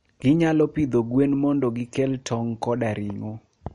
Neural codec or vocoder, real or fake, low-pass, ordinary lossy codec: none; real; 10.8 kHz; MP3, 48 kbps